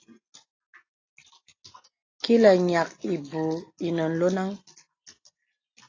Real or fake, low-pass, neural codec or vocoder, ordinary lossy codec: real; 7.2 kHz; none; AAC, 32 kbps